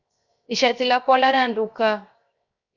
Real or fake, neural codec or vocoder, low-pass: fake; codec, 16 kHz, 0.7 kbps, FocalCodec; 7.2 kHz